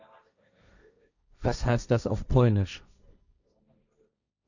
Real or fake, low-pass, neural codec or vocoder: fake; 7.2 kHz; codec, 16 kHz, 1.1 kbps, Voila-Tokenizer